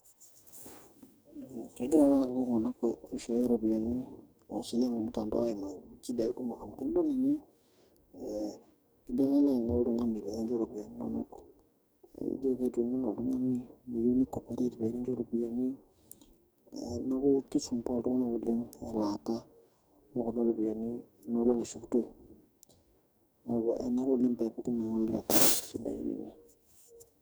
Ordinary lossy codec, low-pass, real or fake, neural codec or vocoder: none; none; fake; codec, 44.1 kHz, 2.6 kbps, DAC